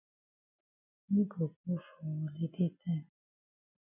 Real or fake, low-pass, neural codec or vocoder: real; 3.6 kHz; none